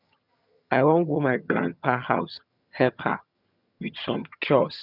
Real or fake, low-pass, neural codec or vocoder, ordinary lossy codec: fake; 5.4 kHz; vocoder, 22.05 kHz, 80 mel bands, HiFi-GAN; none